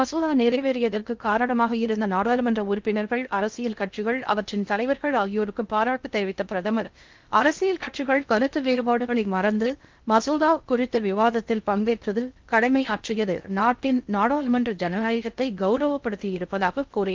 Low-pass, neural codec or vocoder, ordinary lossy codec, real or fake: 7.2 kHz; codec, 16 kHz in and 24 kHz out, 0.6 kbps, FocalCodec, streaming, 2048 codes; Opus, 24 kbps; fake